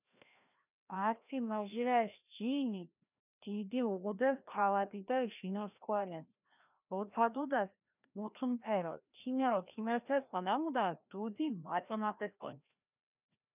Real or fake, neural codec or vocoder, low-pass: fake; codec, 16 kHz, 1 kbps, FreqCodec, larger model; 3.6 kHz